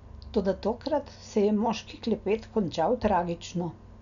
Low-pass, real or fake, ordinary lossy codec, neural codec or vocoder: 7.2 kHz; real; none; none